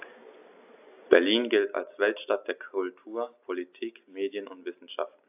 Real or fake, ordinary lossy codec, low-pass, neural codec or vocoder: real; AAC, 32 kbps; 3.6 kHz; none